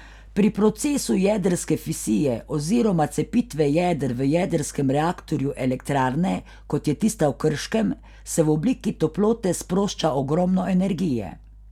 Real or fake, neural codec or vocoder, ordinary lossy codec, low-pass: real; none; none; 19.8 kHz